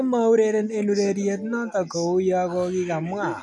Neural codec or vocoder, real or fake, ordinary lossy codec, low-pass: none; real; none; none